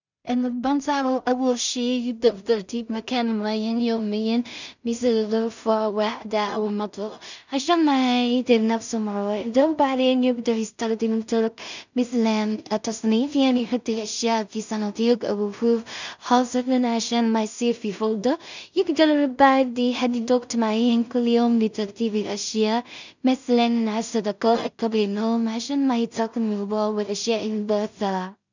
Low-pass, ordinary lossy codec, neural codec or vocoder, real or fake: 7.2 kHz; none; codec, 16 kHz in and 24 kHz out, 0.4 kbps, LongCat-Audio-Codec, two codebook decoder; fake